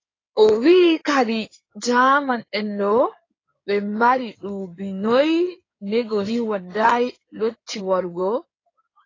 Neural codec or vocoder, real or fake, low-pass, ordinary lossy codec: codec, 16 kHz in and 24 kHz out, 2.2 kbps, FireRedTTS-2 codec; fake; 7.2 kHz; AAC, 32 kbps